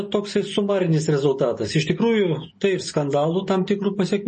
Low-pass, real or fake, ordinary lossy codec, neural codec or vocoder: 10.8 kHz; real; MP3, 32 kbps; none